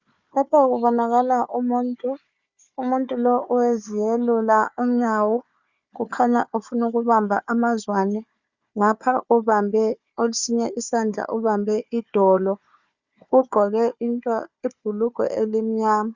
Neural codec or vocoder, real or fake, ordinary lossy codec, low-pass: codec, 16 kHz, 4 kbps, FunCodec, trained on Chinese and English, 50 frames a second; fake; Opus, 64 kbps; 7.2 kHz